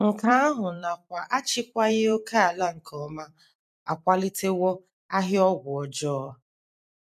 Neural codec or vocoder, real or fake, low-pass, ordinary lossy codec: vocoder, 44.1 kHz, 128 mel bands every 256 samples, BigVGAN v2; fake; 14.4 kHz; none